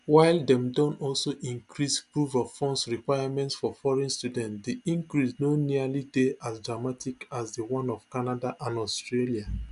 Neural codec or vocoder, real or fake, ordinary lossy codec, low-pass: none; real; none; 10.8 kHz